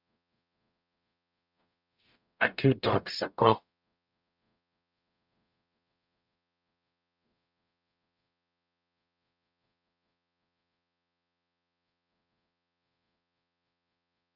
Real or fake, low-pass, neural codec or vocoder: fake; 5.4 kHz; codec, 44.1 kHz, 0.9 kbps, DAC